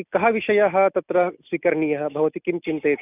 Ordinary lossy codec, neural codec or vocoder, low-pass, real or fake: none; none; 3.6 kHz; real